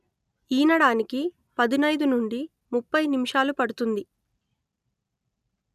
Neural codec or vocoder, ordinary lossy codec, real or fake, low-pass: none; none; real; 14.4 kHz